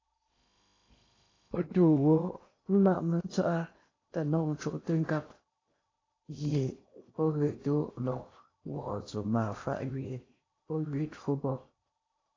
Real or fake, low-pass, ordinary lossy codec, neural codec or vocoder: fake; 7.2 kHz; AAC, 32 kbps; codec, 16 kHz in and 24 kHz out, 0.8 kbps, FocalCodec, streaming, 65536 codes